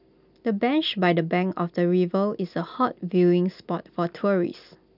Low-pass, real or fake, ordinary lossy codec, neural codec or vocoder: 5.4 kHz; real; none; none